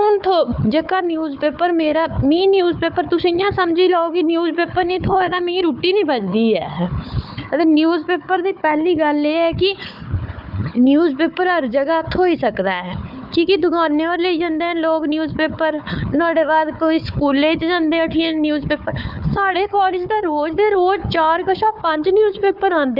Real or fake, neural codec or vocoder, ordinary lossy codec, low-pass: fake; codec, 16 kHz, 4 kbps, FunCodec, trained on Chinese and English, 50 frames a second; none; 5.4 kHz